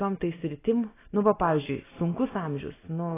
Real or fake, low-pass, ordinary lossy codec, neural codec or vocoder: real; 3.6 kHz; AAC, 16 kbps; none